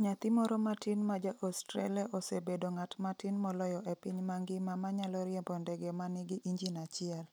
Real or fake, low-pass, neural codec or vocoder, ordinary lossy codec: real; none; none; none